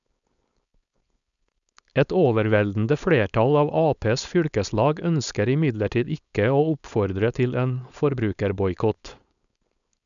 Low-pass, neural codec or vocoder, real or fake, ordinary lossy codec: 7.2 kHz; codec, 16 kHz, 4.8 kbps, FACodec; fake; none